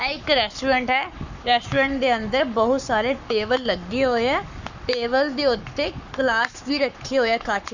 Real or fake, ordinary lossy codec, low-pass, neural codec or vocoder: fake; none; 7.2 kHz; codec, 44.1 kHz, 7.8 kbps, Pupu-Codec